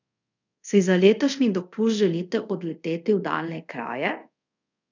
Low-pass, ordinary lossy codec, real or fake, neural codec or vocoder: 7.2 kHz; none; fake; codec, 24 kHz, 0.5 kbps, DualCodec